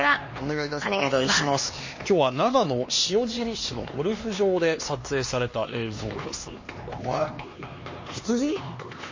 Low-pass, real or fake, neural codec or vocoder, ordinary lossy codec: 7.2 kHz; fake; codec, 16 kHz, 2 kbps, X-Codec, HuBERT features, trained on LibriSpeech; MP3, 32 kbps